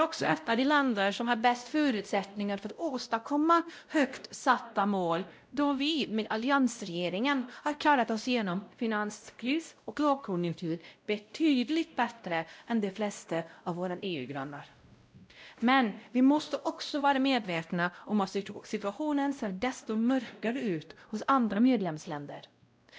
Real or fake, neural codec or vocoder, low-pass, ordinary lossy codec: fake; codec, 16 kHz, 0.5 kbps, X-Codec, WavLM features, trained on Multilingual LibriSpeech; none; none